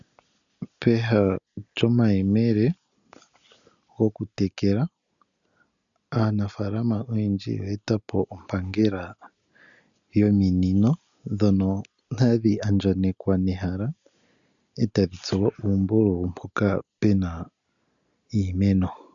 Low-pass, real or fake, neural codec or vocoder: 7.2 kHz; real; none